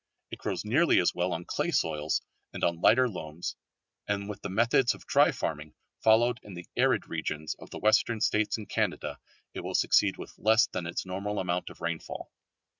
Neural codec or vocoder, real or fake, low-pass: none; real; 7.2 kHz